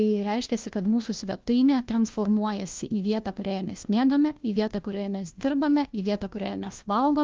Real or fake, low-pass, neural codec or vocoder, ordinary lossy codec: fake; 7.2 kHz; codec, 16 kHz, 1 kbps, FunCodec, trained on LibriTTS, 50 frames a second; Opus, 32 kbps